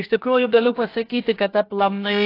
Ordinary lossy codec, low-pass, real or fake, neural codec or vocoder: AAC, 32 kbps; 5.4 kHz; fake; codec, 16 kHz, 0.7 kbps, FocalCodec